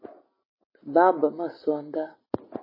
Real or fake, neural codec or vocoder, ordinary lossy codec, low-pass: real; none; MP3, 24 kbps; 5.4 kHz